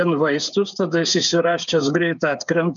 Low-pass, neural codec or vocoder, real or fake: 7.2 kHz; codec, 16 kHz, 8 kbps, FreqCodec, smaller model; fake